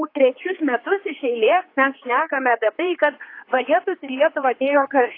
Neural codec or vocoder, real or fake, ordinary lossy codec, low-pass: codec, 16 kHz, 4 kbps, X-Codec, HuBERT features, trained on general audio; fake; AAC, 32 kbps; 5.4 kHz